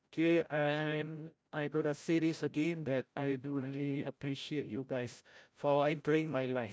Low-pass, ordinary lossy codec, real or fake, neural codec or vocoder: none; none; fake; codec, 16 kHz, 0.5 kbps, FreqCodec, larger model